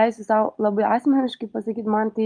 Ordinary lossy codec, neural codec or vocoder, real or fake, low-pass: Opus, 32 kbps; none; real; 9.9 kHz